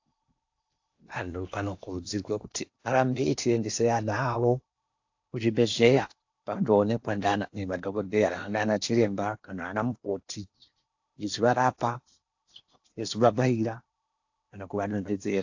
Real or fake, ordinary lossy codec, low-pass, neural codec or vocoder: fake; AAC, 48 kbps; 7.2 kHz; codec, 16 kHz in and 24 kHz out, 0.8 kbps, FocalCodec, streaming, 65536 codes